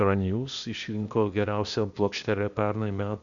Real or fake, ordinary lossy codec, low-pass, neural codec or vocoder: fake; Opus, 64 kbps; 7.2 kHz; codec, 16 kHz, 0.8 kbps, ZipCodec